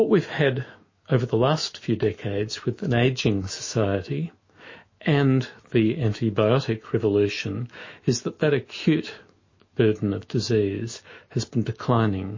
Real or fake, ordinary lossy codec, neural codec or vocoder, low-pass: fake; MP3, 32 kbps; autoencoder, 48 kHz, 128 numbers a frame, DAC-VAE, trained on Japanese speech; 7.2 kHz